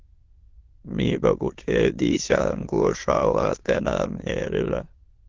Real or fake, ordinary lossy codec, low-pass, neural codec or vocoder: fake; Opus, 16 kbps; 7.2 kHz; autoencoder, 22.05 kHz, a latent of 192 numbers a frame, VITS, trained on many speakers